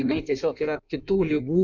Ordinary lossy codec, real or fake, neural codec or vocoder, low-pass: MP3, 64 kbps; fake; codec, 16 kHz in and 24 kHz out, 1.1 kbps, FireRedTTS-2 codec; 7.2 kHz